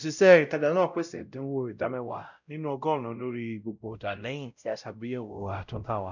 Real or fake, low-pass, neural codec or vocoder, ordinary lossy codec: fake; 7.2 kHz; codec, 16 kHz, 0.5 kbps, X-Codec, WavLM features, trained on Multilingual LibriSpeech; none